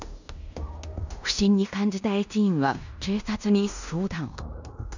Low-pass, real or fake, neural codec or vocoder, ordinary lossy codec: 7.2 kHz; fake; codec, 16 kHz in and 24 kHz out, 0.9 kbps, LongCat-Audio-Codec, fine tuned four codebook decoder; none